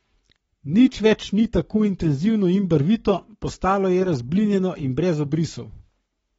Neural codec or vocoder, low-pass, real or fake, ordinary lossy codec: codec, 44.1 kHz, 7.8 kbps, Pupu-Codec; 19.8 kHz; fake; AAC, 24 kbps